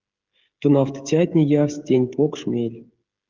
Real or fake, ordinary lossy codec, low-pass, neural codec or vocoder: fake; Opus, 32 kbps; 7.2 kHz; codec, 16 kHz, 16 kbps, FreqCodec, smaller model